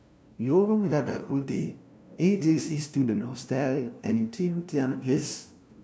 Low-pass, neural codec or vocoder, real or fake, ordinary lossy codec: none; codec, 16 kHz, 0.5 kbps, FunCodec, trained on LibriTTS, 25 frames a second; fake; none